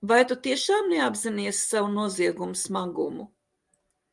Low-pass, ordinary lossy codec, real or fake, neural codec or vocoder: 10.8 kHz; Opus, 32 kbps; real; none